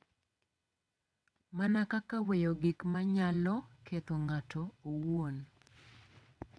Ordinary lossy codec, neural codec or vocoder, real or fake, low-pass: none; vocoder, 22.05 kHz, 80 mel bands, WaveNeXt; fake; none